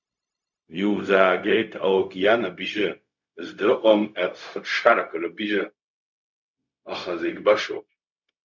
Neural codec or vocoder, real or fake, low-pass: codec, 16 kHz, 0.4 kbps, LongCat-Audio-Codec; fake; 7.2 kHz